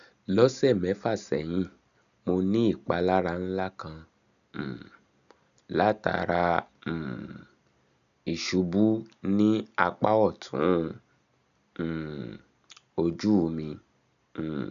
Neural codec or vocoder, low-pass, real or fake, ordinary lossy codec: none; 7.2 kHz; real; none